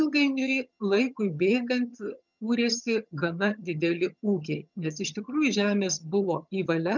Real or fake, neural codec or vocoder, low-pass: fake; vocoder, 22.05 kHz, 80 mel bands, HiFi-GAN; 7.2 kHz